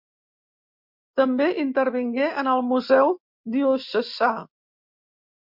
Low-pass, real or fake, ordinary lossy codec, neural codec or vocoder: 5.4 kHz; real; MP3, 48 kbps; none